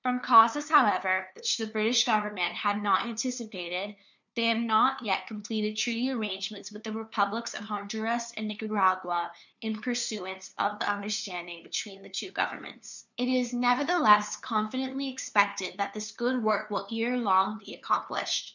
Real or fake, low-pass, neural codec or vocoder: fake; 7.2 kHz; codec, 16 kHz, 2 kbps, FunCodec, trained on LibriTTS, 25 frames a second